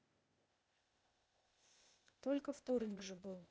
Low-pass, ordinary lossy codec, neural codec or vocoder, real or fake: none; none; codec, 16 kHz, 0.8 kbps, ZipCodec; fake